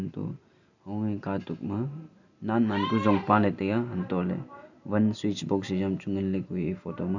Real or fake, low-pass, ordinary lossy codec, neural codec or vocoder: real; 7.2 kHz; none; none